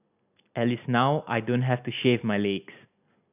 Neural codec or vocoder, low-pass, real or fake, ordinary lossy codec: none; 3.6 kHz; real; none